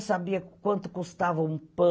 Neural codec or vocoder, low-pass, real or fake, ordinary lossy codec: none; none; real; none